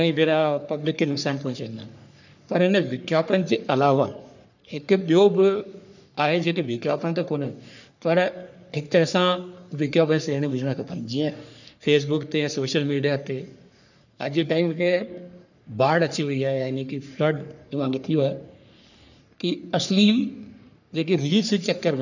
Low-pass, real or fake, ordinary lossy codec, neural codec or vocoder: 7.2 kHz; fake; none; codec, 44.1 kHz, 3.4 kbps, Pupu-Codec